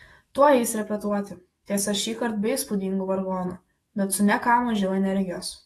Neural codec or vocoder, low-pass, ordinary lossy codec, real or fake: autoencoder, 48 kHz, 128 numbers a frame, DAC-VAE, trained on Japanese speech; 19.8 kHz; AAC, 32 kbps; fake